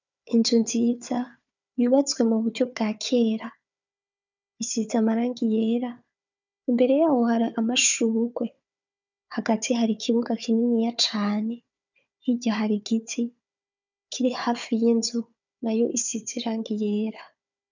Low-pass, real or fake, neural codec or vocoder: 7.2 kHz; fake; codec, 16 kHz, 4 kbps, FunCodec, trained on Chinese and English, 50 frames a second